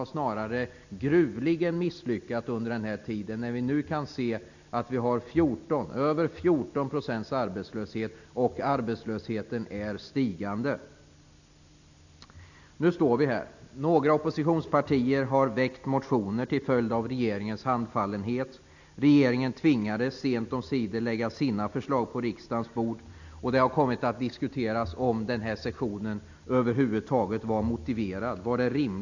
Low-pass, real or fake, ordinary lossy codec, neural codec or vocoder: 7.2 kHz; real; none; none